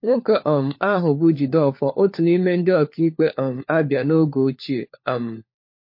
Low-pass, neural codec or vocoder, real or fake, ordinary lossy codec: 5.4 kHz; codec, 16 kHz, 4 kbps, FunCodec, trained on LibriTTS, 50 frames a second; fake; MP3, 32 kbps